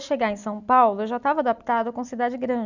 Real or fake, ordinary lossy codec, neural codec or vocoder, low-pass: real; none; none; 7.2 kHz